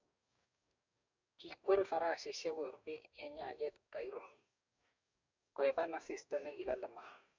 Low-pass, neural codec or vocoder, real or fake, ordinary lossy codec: 7.2 kHz; codec, 44.1 kHz, 2.6 kbps, DAC; fake; MP3, 64 kbps